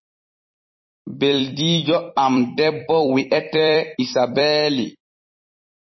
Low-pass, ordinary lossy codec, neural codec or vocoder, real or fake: 7.2 kHz; MP3, 24 kbps; vocoder, 44.1 kHz, 128 mel bands every 256 samples, BigVGAN v2; fake